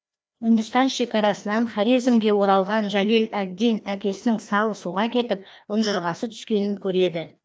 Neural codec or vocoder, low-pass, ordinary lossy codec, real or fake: codec, 16 kHz, 1 kbps, FreqCodec, larger model; none; none; fake